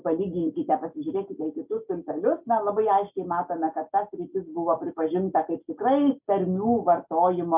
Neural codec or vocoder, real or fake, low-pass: none; real; 3.6 kHz